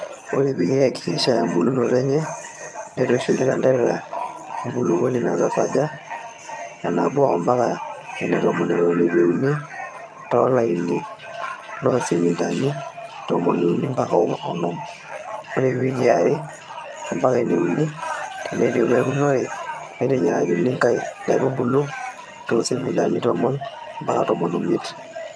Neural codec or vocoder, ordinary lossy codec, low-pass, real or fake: vocoder, 22.05 kHz, 80 mel bands, HiFi-GAN; none; none; fake